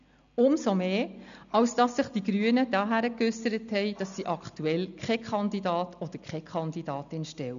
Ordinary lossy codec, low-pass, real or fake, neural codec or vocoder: none; 7.2 kHz; real; none